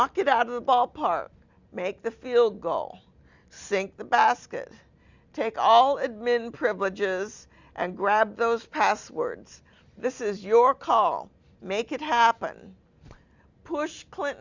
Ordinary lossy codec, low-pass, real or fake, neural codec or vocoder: Opus, 64 kbps; 7.2 kHz; real; none